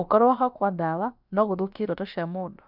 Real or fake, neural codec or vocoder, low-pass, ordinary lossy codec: fake; codec, 16 kHz, about 1 kbps, DyCAST, with the encoder's durations; 5.4 kHz; none